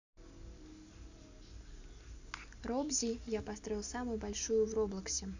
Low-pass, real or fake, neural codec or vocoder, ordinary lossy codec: 7.2 kHz; real; none; none